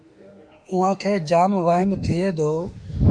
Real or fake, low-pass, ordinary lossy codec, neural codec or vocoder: fake; 9.9 kHz; Opus, 64 kbps; autoencoder, 48 kHz, 32 numbers a frame, DAC-VAE, trained on Japanese speech